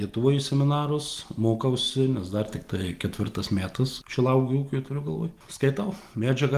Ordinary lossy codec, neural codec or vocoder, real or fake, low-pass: Opus, 24 kbps; none; real; 14.4 kHz